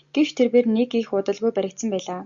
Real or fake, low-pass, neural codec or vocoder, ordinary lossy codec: real; 7.2 kHz; none; Opus, 64 kbps